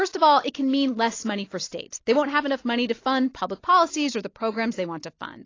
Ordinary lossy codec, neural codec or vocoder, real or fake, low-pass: AAC, 32 kbps; none; real; 7.2 kHz